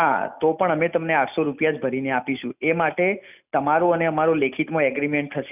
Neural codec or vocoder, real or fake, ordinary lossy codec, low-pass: none; real; none; 3.6 kHz